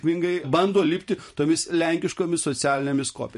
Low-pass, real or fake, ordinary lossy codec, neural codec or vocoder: 14.4 kHz; fake; MP3, 48 kbps; vocoder, 44.1 kHz, 128 mel bands every 512 samples, BigVGAN v2